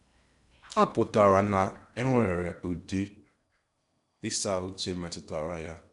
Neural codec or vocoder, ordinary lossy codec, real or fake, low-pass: codec, 16 kHz in and 24 kHz out, 0.8 kbps, FocalCodec, streaming, 65536 codes; none; fake; 10.8 kHz